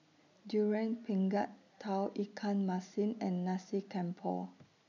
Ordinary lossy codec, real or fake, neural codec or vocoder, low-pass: none; real; none; 7.2 kHz